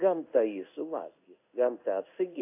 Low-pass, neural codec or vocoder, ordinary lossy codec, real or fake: 3.6 kHz; codec, 16 kHz in and 24 kHz out, 1 kbps, XY-Tokenizer; AAC, 24 kbps; fake